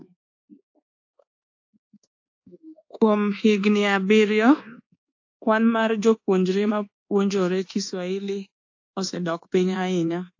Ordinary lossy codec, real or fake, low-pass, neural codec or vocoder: AAC, 48 kbps; fake; 7.2 kHz; codec, 24 kHz, 1.2 kbps, DualCodec